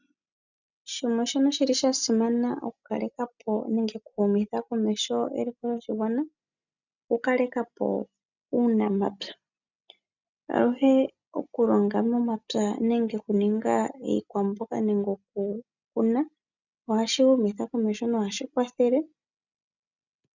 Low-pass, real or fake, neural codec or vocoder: 7.2 kHz; real; none